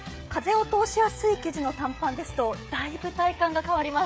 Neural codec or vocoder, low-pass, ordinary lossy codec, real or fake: codec, 16 kHz, 16 kbps, FreqCodec, smaller model; none; none; fake